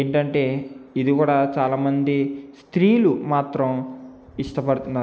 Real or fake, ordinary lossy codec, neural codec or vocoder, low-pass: real; none; none; none